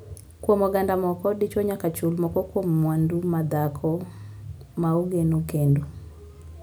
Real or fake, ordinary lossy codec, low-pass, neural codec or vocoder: real; none; none; none